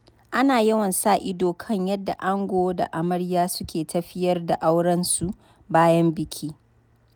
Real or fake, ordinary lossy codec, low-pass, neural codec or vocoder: real; none; none; none